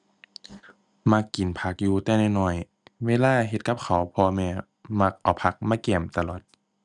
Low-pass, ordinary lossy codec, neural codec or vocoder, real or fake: 10.8 kHz; none; none; real